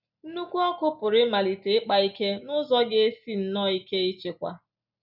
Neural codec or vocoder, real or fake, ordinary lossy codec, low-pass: none; real; none; 5.4 kHz